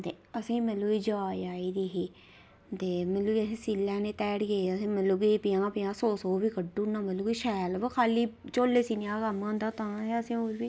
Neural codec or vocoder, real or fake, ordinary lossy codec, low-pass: none; real; none; none